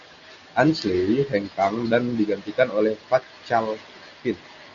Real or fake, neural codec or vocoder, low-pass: real; none; 7.2 kHz